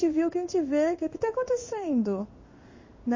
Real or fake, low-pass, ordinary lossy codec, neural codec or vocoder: fake; 7.2 kHz; MP3, 32 kbps; codec, 16 kHz in and 24 kHz out, 1 kbps, XY-Tokenizer